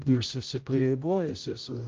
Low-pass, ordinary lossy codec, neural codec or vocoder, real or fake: 7.2 kHz; Opus, 24 kbps; codec, 16 kHz, 0.5 kbps, X-Codec, HuBERT features, trained on general audio; fake